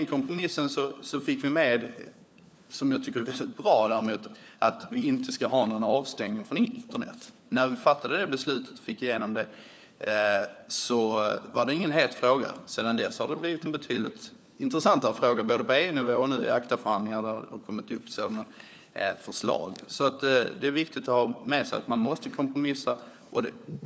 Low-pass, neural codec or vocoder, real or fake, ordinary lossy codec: none; codec, 16 kHz, 4 kbps, FunCodec, trained on LibriTTS, 50 frames a second; fake; none